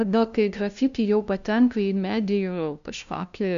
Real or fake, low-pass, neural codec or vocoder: fake; 7.2 kHz; codec, 16 kHz, 0.5 kbps, FunCodec, trained on LibriTTS, 25 frames a second